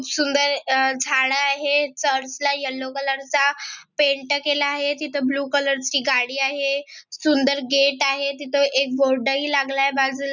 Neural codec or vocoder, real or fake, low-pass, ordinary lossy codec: none; real; 7.2 kHz; none